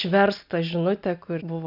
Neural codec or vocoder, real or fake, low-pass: none; real; 5.4 kHz